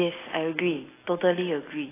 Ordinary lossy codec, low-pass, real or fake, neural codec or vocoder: AAC, 16 kbps; 3.6 kHz; real; none